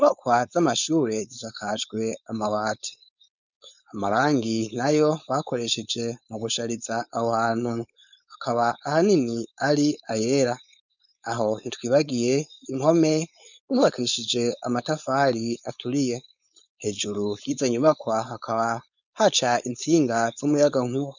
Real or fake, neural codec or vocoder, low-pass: fake; codec, 16 kHz, 4.8 kbps, FACodec; 7.2 kHz